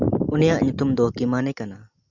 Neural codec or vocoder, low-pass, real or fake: none; 7.2 kHz; real